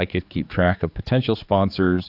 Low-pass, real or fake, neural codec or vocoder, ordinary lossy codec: 5.4 kHz; fake; codec, 16 kHz, 4 kbps, X-Codec, HuBERT features, trained on general audio; AAC, 48 kbps